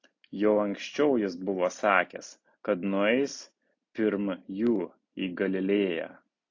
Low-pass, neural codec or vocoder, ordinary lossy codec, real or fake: 7.2 kHz; none; AAC, 48 kbps; real